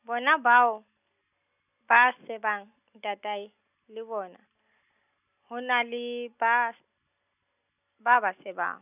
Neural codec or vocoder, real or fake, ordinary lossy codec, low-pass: none; real; none; 3.6 kHz